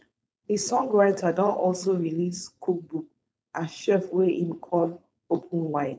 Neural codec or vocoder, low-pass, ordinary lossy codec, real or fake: codec, 16 kHz, 4.8 kbps, FACodec; none; none; fake